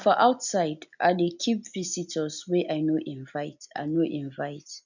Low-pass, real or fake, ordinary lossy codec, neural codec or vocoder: 7.2 kHz; real; none; none